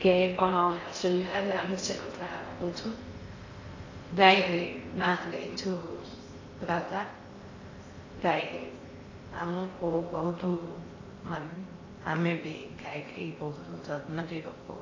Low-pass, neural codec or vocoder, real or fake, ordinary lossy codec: 7.2 kHz; codec, 16 kHz in and 24 kHz out, 0.6 kbps, FocalCodec, streaming, 4096 codes; fake; AAC, 32 kbps